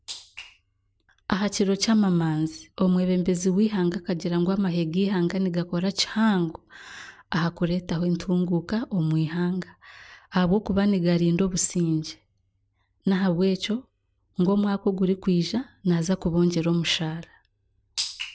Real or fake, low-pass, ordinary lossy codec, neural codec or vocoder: real; none; none; none